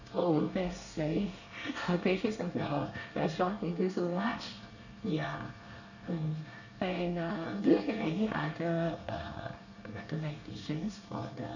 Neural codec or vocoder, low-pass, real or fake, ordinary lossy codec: codec, 24 kHz, 1 kbps, SNAC; 7.2 kHz; fake; none